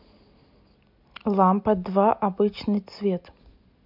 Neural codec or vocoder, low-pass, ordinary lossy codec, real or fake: none; 5.4 kHz; MP3, 48 kbps; real